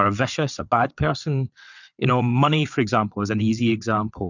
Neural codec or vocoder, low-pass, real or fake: vocoder, 22.05 kHz, 80 mel bands, WaveNeXt; 7.2 kHz; fake